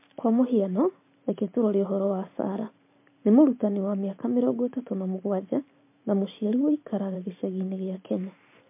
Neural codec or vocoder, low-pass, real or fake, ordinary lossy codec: vocoder, 44.1 kHz, 128 mel bands every 512 samples, BigVGAN v2; 3.6 kHz; fake; MP3, 24 kbps